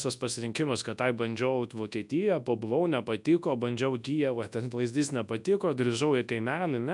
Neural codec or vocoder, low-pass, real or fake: codec, 24 kHz, 0.9 kbps, WavTokenizer, large speech release; 10.8 kHz; fake